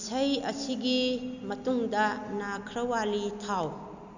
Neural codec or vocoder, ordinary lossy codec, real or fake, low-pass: none; none; real; 7.2 kHz